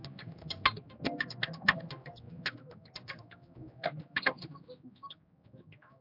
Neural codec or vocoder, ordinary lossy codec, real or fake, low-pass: codec, 16 kHz, 1 kbps, X-Codec, HuBERT features, trained on general audio; MP3, 48 kbps; fake; 5.4 kHz